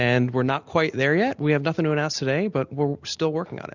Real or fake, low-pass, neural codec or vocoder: real; 7.2 kHz; none